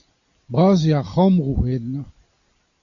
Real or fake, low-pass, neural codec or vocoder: real; 7.2 kHz; none